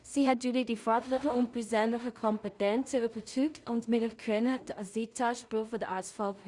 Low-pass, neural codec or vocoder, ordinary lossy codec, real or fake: 10.8 kHz; codec, 16 kHz in and 24 kHz out, 0.4 kbps, LongCat-Audio-Codec, two codebook decoder; Opus, 64 kbps; fake